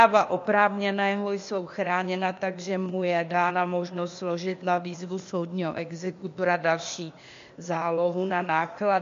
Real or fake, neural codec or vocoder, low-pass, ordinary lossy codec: fake; codec, 16 kHz, 0.8 kbps, ZipCodec; 7.2 kHz; MP3, 48 kbps